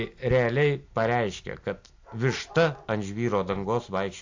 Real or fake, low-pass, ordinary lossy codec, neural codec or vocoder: real; 7.2 kHz; AAC, 48 kbps; none